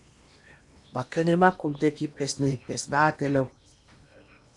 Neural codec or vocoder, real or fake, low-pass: codec, 16 kHz in and 24 kHz out, 0.8 kbps, FocalCodec, streaming, 65536 codes; fake; 10.8 kHz